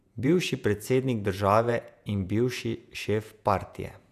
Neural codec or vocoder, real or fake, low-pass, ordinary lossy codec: vocoder, 48 kHz, 128 mel bands, Vocos; fake; 14.4 kHz; none